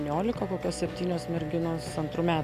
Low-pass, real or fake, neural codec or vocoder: 14.4 kHz; real; none